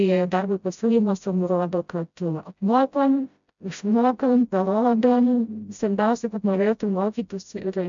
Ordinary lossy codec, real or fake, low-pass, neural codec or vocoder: MP3, 64 kbps; fake; 7.2 kHz; codec, 16 kHz, 0.5 kbps, FreqCodec, smaller model